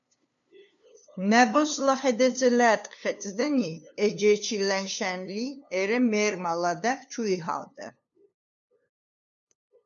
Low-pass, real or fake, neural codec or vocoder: 7.2 kHz; fake; codec, 16 kHz, 2 kbps, FunCodec, trained on LibriTTS, 25 frames a second